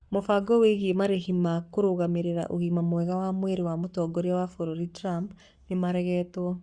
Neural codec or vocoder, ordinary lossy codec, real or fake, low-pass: codec, 44.1 kHz, 7.8 kbps, Pupu-Codec; Opus, 64 kbps; fake; 9.9 kHz